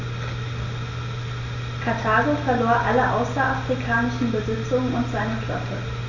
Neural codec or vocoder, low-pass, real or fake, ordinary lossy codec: none; 7.2 kHz; real; none